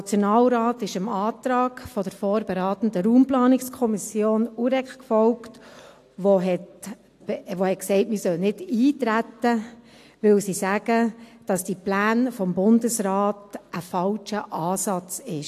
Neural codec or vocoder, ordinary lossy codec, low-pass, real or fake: none; AAC, 64 kbps; 14.4 kHz; real